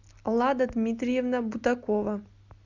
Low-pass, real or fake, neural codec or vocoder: 7.2 kHz; real; none